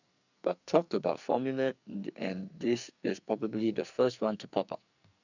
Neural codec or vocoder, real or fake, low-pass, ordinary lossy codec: codec, 44.1 kHz, 2.6 kbps, SNAC; fake; 7.2 kHz; none